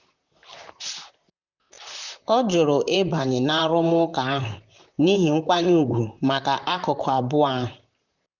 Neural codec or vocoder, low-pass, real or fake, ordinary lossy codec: vocoder, 44.1 kHz, 128 mel bands, Pupu-Vocoder; 7.2 kHz; fake; none